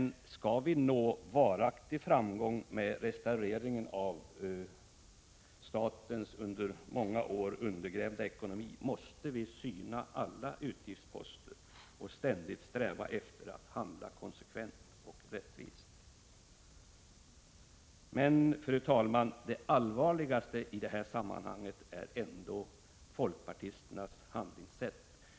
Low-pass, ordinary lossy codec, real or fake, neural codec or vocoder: none; none; real; none